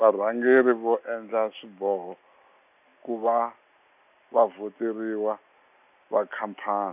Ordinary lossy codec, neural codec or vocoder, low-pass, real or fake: none; none; 3.6 kHz; real